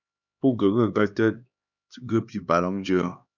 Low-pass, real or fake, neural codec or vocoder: 7.2 kHz; fake; codec, 16 kHz, 2 kbps, X-Codec, HuBERT features, trained on LibriSpeech